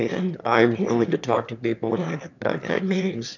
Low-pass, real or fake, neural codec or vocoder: 7.2 kHz; fake; autoencoder, 22.05 kHz, a latent of 192 numbers a frame, VITS, trained on one speaker